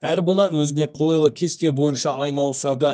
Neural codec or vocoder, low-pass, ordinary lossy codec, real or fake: codec, 24 kHz, 0.9 kbps, WavTokenizer, medium music audio release; 9.9 kHz; none; fake